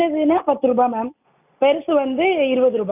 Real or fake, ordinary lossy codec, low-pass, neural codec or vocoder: real; none; 3.6 kHz; none